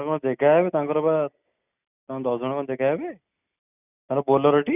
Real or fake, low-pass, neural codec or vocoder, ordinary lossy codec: real; 3.6 kHz; none; none